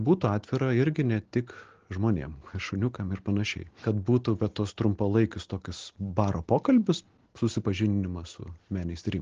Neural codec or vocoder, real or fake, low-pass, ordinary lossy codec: none; real; 7.2 kHz; Opus, 16 kbps